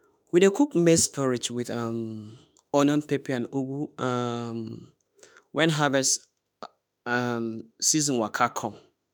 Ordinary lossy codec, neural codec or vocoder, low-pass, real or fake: none; autoencoder, 48 kHz, 32 numbers a frame, DAC-VAE, trained on Japanese speech; none; fake